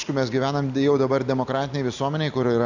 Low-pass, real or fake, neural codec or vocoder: 7.2 kHz; real; none